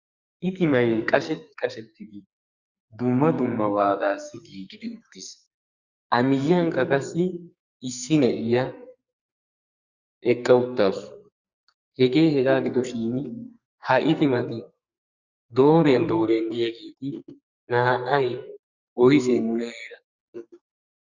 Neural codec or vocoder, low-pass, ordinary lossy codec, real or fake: codec, 44.1 kHz, 2.6 kbps, SNAC; 7.2 kHz; Opus, 64 kbps; fake